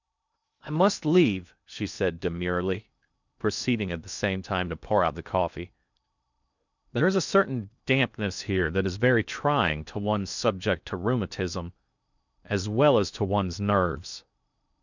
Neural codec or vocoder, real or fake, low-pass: codec, 16 kHz in and 24 kHz out, 0.6 kbps, FocalCodec, streaming, 2048 codes; fake; 7.2 kHz